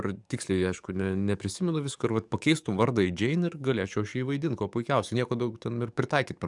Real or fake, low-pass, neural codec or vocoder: fake; 10.8 kHz; codec, 44.1 kHz, 7.8 kbps, DAC